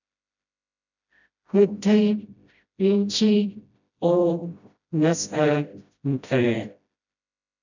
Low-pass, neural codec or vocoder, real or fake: 7.2 kHz; codec, 16 kHz, 0.5 kbps, FreqCodec, smaller model; fake